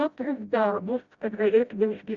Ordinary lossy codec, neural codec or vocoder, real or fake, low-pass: Opus, 64 kbps; codec, 16 kHz, 0.5 kbps, FreqCodec, smaller model; fake; 7.2 kHz